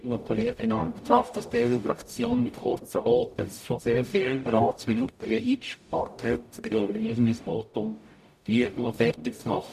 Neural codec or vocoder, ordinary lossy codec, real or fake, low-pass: codec, 44.1 kHz, 0.9 kbps, DAC; none; fake; 14.4 kHz